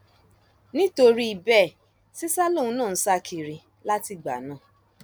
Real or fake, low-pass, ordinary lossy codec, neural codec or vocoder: real; none; none; none